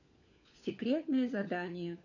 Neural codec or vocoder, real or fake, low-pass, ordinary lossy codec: codec, 16 kHz, 4 kbps, FunCodec, trained on LibriTTS, 50 frames a second; fake; 7.2 kHz; none